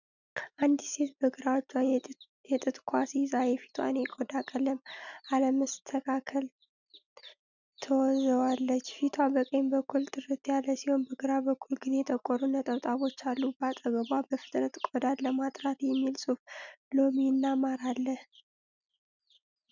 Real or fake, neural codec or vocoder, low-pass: real; none; 7.2 kHz